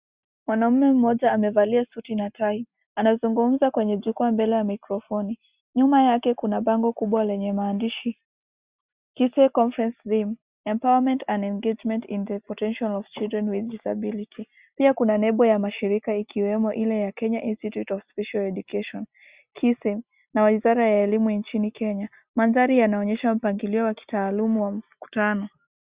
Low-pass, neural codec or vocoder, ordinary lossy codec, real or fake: 3.6 kHz; none; Opus, 64 kbps; real